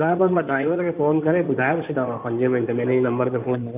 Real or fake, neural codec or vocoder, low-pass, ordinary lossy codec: fake; codec, 16 kHz in and 24 kHz out, 2.2 kbps, FireRedTTS-2 codec; 3.6 kHz; none